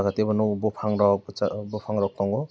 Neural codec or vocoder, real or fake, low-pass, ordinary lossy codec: none; real; none; none